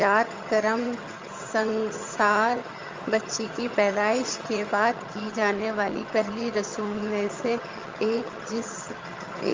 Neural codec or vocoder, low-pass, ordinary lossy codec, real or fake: vocoder, 22.05 kHz, 80 mel bands, HiFi-GAN; 7.2 kHz; Opus, 32 kbps; fake